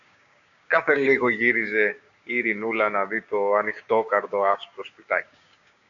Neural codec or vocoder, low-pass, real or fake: codec, 16 kHz, 6 kbps, DAC; 7.2 kHz; fake